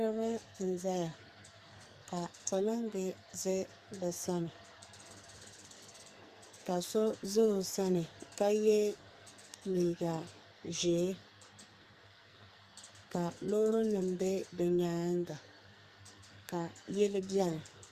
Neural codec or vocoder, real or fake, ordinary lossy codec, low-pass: codec, 44.1 kHz, 2.6 kbps, SNAC; fake; Opus, 64 kbps; 14.4 kHz